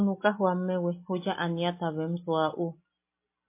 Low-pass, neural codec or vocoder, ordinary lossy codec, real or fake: 3.6 kHz; none; MP3, 24 kbps; real